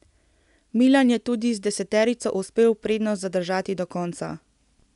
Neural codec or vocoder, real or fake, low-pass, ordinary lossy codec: none; real; 10.8 kHz; none